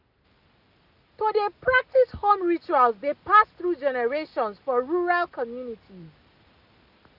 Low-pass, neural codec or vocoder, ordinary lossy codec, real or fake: 5.4 kHz; none; none; real